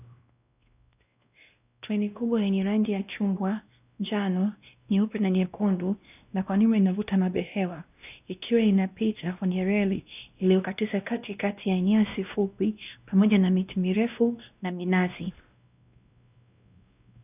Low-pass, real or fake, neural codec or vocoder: 3.6 kHz; fake; codec, 16 kHz, 1 kbps, X-Codec, WavLM features, trained on Multilingual LibriSpeech